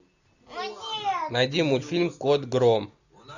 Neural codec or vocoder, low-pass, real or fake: none; 7.2 kHz; real